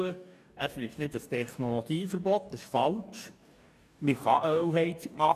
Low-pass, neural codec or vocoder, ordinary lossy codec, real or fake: 14.4 kHz; codec, 44.1 kHz, 2.6 kbps, DAC; none; fake